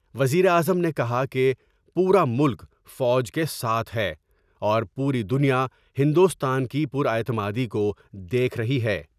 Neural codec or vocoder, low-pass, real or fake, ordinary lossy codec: none; 14.4 kHz; real; none